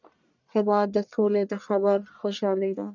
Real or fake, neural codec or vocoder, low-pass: fake; codec, 44.1 kHz, 1.7 kbps, Pupu-Codec; 7.2 kHz